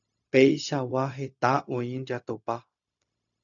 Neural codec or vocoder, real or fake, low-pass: codec, 16 kHz, 0.4 kbps, LongCat-Audio-Codec; fake; 7.2 kHz